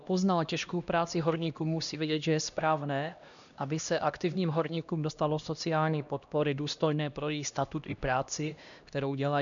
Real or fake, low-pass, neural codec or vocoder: fake; 7.2 kHz; codec, 16 kHz, 1 kbps, X-Codec, HuBERT features, trained on LibriSpeech